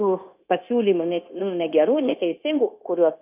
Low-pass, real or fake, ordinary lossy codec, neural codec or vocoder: 3.6 kHz; fake; AAC, 32 kbps; codec, 16 kHz, 0.9 kbps, LongCat-Audio-Codec